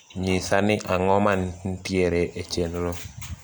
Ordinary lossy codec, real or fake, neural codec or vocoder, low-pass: none; real; none; none